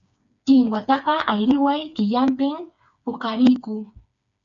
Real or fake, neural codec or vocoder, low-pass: fake; codec, 16 kHz, 4 kbps, FreqCodec, smaller model; 7.2 kHz